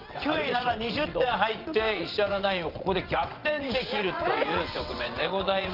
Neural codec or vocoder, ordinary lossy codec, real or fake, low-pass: vocoder, 22.05 kHz, 80 mel bands, WaveNeXt; Opus, 24 kbps; fake; 5.4 kHz